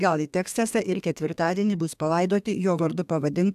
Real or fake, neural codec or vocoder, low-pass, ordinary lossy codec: fake; codec, 32 kHz, 1.9 kbps, SNAC; 14.4 kHz; AAC, 96 kbps